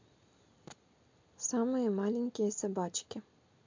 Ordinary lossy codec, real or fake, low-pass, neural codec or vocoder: MP3, 64 kbps; real; 7.2 kHz; none